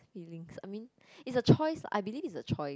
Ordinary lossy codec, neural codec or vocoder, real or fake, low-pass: none; none; real; none